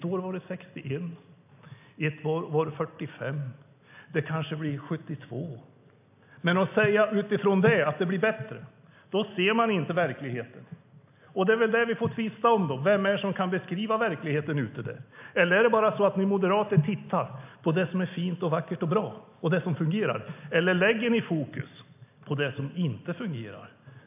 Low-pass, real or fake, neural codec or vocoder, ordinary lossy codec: 3.6 kHz; real; none; AAC, 32 kbps